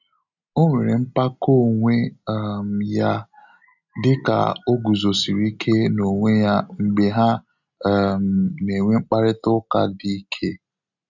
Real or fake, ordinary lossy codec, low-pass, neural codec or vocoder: real; none; 7.2 kHz; none